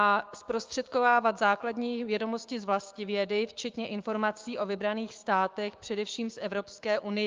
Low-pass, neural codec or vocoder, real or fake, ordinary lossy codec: 7.2 kHz; codec, 16 kHz, 6 kbps, DAC; fake; Opus, 32 kbps